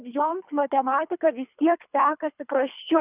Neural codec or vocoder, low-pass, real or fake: codec, 24 kHz, 3 kbps, HILCodec; 3.6 kHz; fake